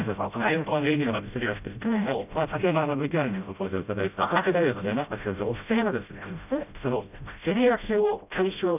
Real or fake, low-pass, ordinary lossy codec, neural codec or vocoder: fake; 3.6 kHz; none; codec, 16 kHz, 0.5 kbps, FreqCodec, smaller model